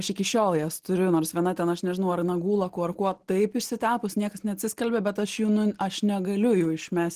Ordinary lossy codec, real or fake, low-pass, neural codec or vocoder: Opus, 16 kbps; real; 14.4 kHz; none